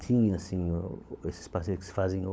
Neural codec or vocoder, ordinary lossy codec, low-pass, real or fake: codec, 16 kHz, 16 kbps, FunCodec, trained on LibriTTS, 50 frames a second; none; none; fake